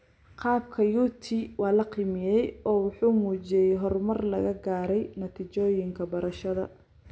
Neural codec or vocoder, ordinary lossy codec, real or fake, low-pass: none; none; real; none